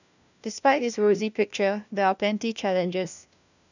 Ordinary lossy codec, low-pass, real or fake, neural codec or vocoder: none; 7.2 kHz; fake; codec, 16 kHz, 1 kbps, FunCodec, trained on LibriTTS, 50 frames a second